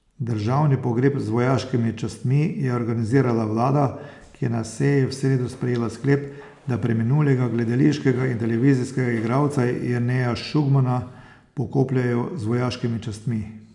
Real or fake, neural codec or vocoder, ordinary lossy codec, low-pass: real; none; none; 10.8 kHz